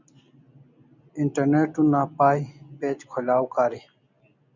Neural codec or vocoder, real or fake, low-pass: none; real; 7.2 kHz